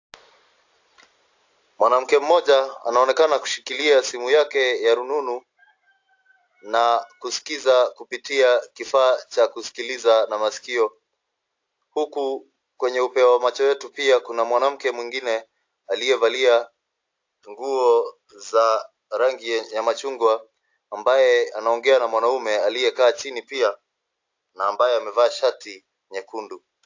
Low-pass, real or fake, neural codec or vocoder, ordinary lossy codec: 7.2 kHz; real; none; AAC, 48 kbps